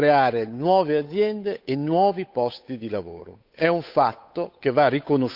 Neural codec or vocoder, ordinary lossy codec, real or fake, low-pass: codec, 16 kHz, 8 kbps, FunCodec, trained on Chinese and English, 25 frames a second; none; fake; 5.4 kHz